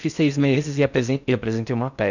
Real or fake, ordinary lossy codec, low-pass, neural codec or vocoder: fake; none; 7.2 kHz; codec, 16 kHz in and 24 kHz out, 0.6 kbps, FocalCodec, streaming, 4096 codes